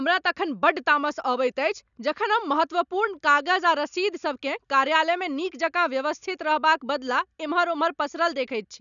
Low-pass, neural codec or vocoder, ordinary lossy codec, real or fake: 7.2 kHz; none; none; real